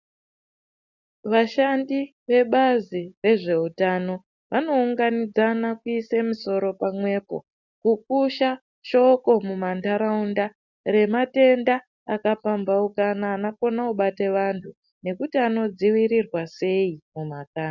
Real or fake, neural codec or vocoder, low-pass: real; none; 7.2 kHz